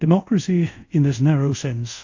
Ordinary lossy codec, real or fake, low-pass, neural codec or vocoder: AAC, 48 kbps; fake; 7.2 kHz; codec, 24 kHz, 0.5 kbps, DualCodec